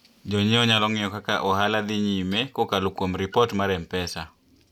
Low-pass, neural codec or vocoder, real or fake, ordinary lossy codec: 19.8 kHz; none; real; none